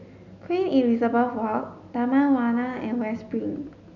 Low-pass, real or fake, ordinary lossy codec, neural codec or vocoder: 7.2 kHz; real; none; none